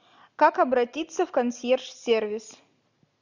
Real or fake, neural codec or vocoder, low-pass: real; none; 7.2 kHz